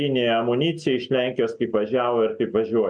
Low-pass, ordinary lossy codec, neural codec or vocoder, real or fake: 9.9 kHz; MP3, 64 kbps; none; real